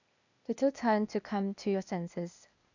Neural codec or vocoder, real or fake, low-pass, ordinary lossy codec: codec, 16 kHz, 0.8 kbps, ZipCodec; fake; 7.2 kHz; none